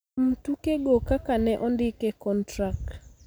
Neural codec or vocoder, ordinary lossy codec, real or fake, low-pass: none; none; real; none